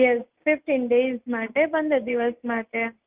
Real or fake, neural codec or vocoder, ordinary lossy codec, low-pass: real; none; Opus, 32 kbps; 3.6 kHz